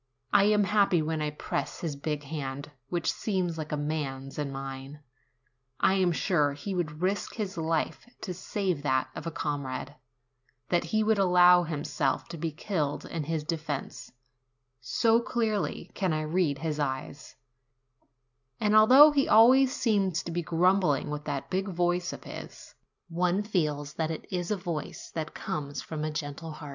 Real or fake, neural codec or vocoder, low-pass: real; none; 7.2 kHz